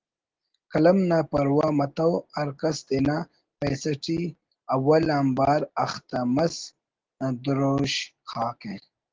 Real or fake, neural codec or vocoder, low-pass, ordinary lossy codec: real; none; 7.2 kHz; Opus, 16 kbps